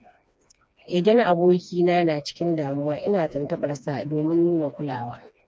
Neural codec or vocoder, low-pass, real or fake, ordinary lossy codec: codec, 16 kHz, 2 kbps, FreqCodec, smaller model; none; fake; none